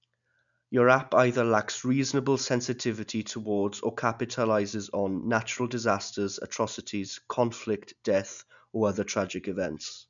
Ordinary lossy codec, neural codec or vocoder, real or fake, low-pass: none; none; real; 7.2 kHz